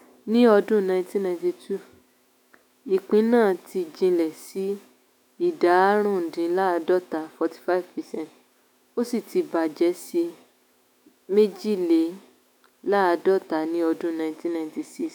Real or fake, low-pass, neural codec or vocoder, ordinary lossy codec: fake; 19.8 kHz; autoencoder, 48 kHz, 128 numbers a frame, DAC-VAE, trained on Japanese speech; none